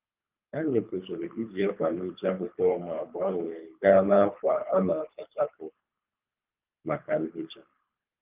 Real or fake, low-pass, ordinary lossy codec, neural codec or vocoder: fake; 3.6 kHz; Opus, 24 kbps; codec, 24 kHz, 3 kbps, HILCodec